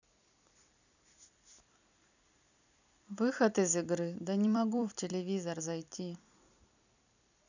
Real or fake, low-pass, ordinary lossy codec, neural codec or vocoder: fake; 7.2 kHz; none; vocoder, 44.1 kHz, 128 mel bands every 256 samples, BigVGAN v2